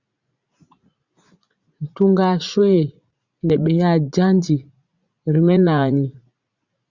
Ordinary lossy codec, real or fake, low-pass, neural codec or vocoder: Opus, 64 kbps; fake; 7.2 kHz; vocoder, 44.1 kHz, 80 mel bands, Vocos